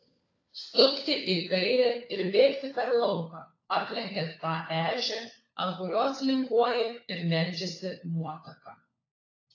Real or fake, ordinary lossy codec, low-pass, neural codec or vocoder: fake; AAC, 32 kbps; 7.2 kHz; codec, 16 kHz, 4 kbps, FunCodec, trained on LibriTTS, 50 frames a second